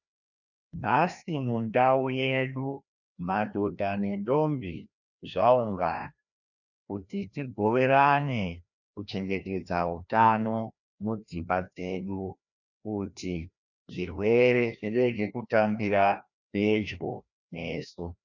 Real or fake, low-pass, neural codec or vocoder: fake; 7.2 kHz; codec, 16 kHz, 1 kbps, FreqCodec, larger model